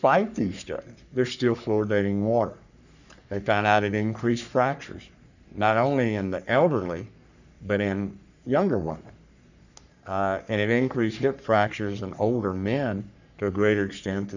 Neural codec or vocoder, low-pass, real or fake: codec, 44.1 kHz, 3.4 kbps, Pupu-Codec; 7.2 kHz; fake